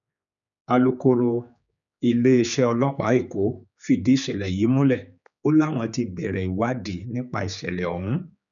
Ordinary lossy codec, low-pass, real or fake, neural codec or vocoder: none; 7.2 kHz; fake; codec, 16 kHz, 4 kbps, X-Codec, HuBERT features, trained on general audio